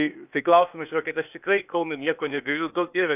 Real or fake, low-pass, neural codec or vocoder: fake; 3.6 kHz; codec, 16 kHz, 0.8 kbps, ZipCodec